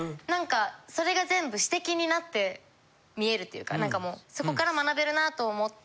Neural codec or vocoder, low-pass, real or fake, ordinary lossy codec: none; none; real; none